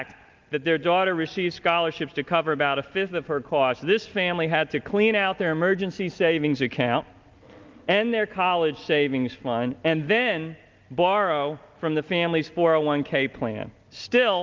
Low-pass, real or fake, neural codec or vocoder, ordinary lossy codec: 7.2 kHz; real; none; Opus, 24 kbps